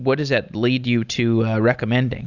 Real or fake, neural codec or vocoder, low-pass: real; none; 7.2 kHz